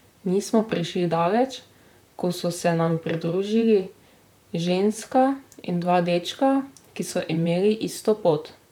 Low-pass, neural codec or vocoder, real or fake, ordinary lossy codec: 19.8 kHz; vocoder, 44.1 kHz, 128 mel bands, Pupu-Vocoder; fake; none